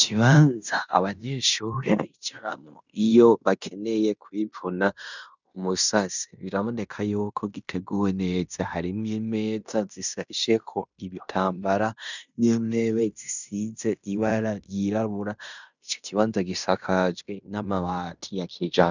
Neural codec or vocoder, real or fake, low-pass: codec, 16 kHz in and 24 kHz out, 0.9 kbps, LongCat-Audio-Codec, fine tuned four codebook decoder; fake; 7.2 kHz